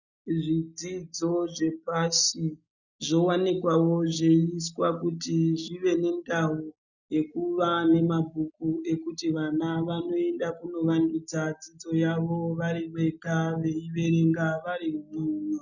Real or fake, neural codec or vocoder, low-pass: real; none; 7.2 kHz